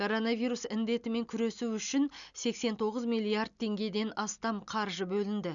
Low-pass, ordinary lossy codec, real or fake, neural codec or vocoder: 7.2 kHz; none; real; none